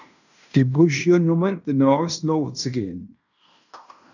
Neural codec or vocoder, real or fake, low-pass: codec, 16 kHz in and 24 kHz out, 0.9 kbps, LongCat-Audio-Codec, fine tuned four codebook decoder; fake; 7.2 kHz